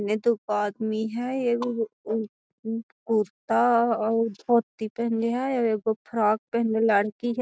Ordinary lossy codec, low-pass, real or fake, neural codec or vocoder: none; none; real; none